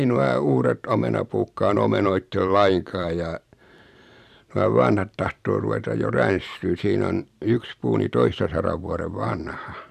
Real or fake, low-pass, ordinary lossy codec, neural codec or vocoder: fake; 14.4 kHz; none; vocoder, 44.1 kHz, 128 mel bands every 512 samples, BigVGAN v2